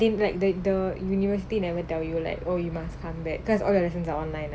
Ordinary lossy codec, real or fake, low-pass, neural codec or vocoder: none; real; none; none